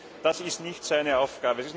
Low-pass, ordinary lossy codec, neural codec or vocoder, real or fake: none; none; none; real